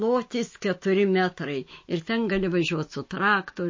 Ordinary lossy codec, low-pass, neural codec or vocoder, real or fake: MP3, 32 kbps; 7.2 kHz; autoencoder, 48 kHz, 128 numbers a frame, DAC-VAE, trained on Japanese speech; fake